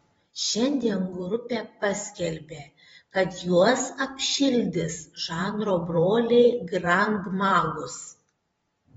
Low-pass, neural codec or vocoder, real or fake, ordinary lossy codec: 19.8 kHz; vocoder, 44.1 kHz, 128 mel bands every 512 samples, BigVGAN v2; fake; AAC, 24 kbps